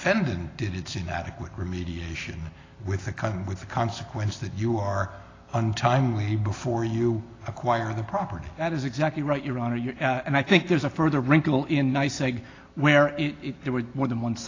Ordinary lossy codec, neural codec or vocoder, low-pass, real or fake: AAC, 32 kbps; none; 7.2 kHz; real